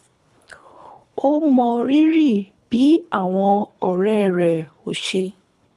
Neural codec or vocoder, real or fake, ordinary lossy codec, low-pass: codec, 24 kHz, 3 kbps, HILCodec; fake; none; none